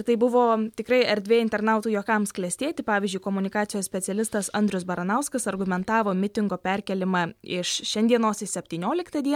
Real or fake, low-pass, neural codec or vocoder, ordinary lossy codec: real; 19.8 kHz; none; MP3, 96 kbps